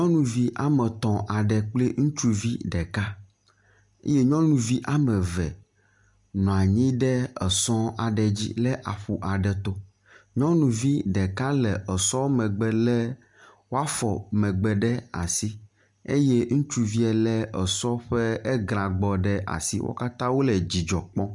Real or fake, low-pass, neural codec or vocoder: real; 10.8 kHz; none